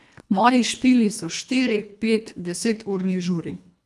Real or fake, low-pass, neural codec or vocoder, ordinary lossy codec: fake; none; codec, 24 kHz, 1.5 kbps, HILCodec; none